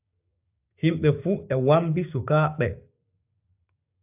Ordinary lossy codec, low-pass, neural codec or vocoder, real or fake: AAC, 32 kbps; 3.6 kHz; codec, 16 kHz, 6 kbps, DAC; fake